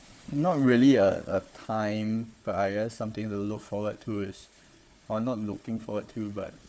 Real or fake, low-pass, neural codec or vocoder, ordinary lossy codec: fake; none; codec, 16 kHz, 4 kbps, FunCodec, trained on Chinese and English, 50 frames a second; none